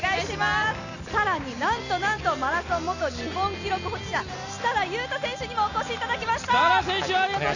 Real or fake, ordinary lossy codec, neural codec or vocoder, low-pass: real; none; none; 7.2 kHz